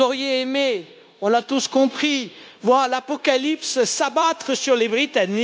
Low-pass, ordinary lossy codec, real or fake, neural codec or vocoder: none; none; fake; codec, 16 kHz, 0.9 kbps, LongCat-Audio-Codec